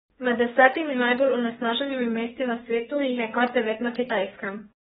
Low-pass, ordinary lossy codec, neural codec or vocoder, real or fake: 14.4 kHz; AAC, 16 kbps; codec, 32 kHz, 1.9 kbps, SNAC; fake